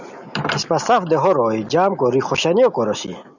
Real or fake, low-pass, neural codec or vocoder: real; 7.2 kHz; none